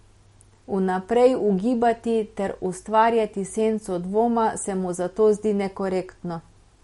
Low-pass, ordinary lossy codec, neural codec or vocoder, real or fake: 19.8 kHz; MP3, 48 kbps; none; real